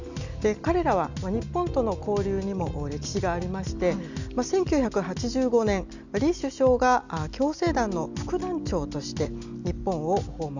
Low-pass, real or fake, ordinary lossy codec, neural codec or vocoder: 7.2 kHz; real; none; none